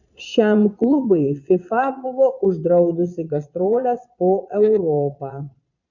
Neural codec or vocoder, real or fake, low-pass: vocoder, 22.05 kHz, 80 mel bands, Vocos; fake; 7.2 kHz